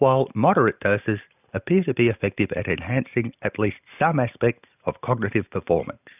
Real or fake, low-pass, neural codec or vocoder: real; 3.6 kHz; none